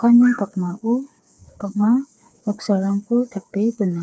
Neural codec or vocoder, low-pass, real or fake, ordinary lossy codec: codec, 16 kHz, 4 kbps, FreqCodec, smaller model; none; fake; none